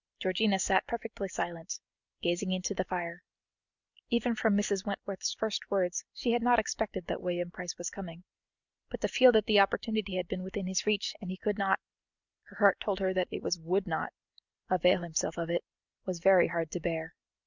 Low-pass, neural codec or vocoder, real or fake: 7.2 kHz; none; real